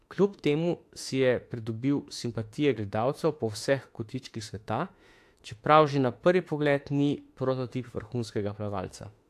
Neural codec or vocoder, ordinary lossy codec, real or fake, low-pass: autoencoder, 48 kHz, 32 numbers a frame, DAC-VAE, trained on Japanese speech; AAC, 64 kbps; fake; 14.4 kHz